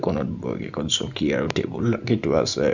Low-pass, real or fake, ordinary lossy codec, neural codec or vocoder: 7.2 kHz; real; none; none